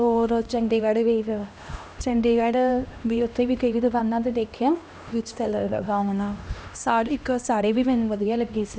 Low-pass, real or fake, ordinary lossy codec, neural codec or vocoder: none; fake; none; codec, 16 kHz, 1 kbps, X-Codec, HuBERT features, trained on LibriSpeech